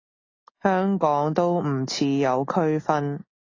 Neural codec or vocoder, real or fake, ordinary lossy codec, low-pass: none; real; AAC, 32 kbps; 7.2 kHz